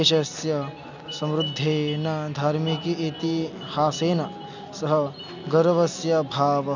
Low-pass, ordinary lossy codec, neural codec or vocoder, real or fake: 7.2 kHz; none; none; real